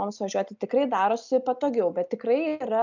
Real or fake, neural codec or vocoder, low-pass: real; none; 7.2 kHz